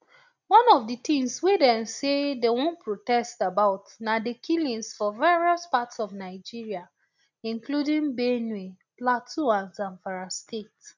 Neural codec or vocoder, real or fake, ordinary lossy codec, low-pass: none; real; none; 7.2 kHz